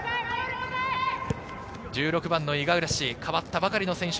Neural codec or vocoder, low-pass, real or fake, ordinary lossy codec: none; none; real; none